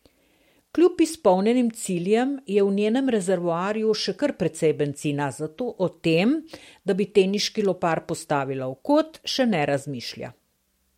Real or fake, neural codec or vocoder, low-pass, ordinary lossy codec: real; none; 19.8 kHz; MP3, 64 kbps